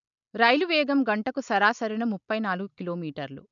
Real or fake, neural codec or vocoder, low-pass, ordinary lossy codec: real; none; 7.2 kHz; none